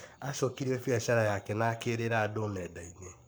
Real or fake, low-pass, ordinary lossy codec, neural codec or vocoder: fake; none; none; codec, 44.1 kHz, 7.8 kbps, Pupu-Codec